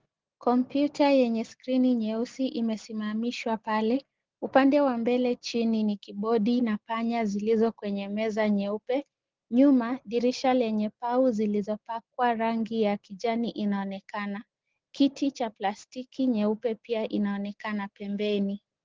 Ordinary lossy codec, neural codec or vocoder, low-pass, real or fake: Opus, 16 kbps; none; 7.2 kHz; real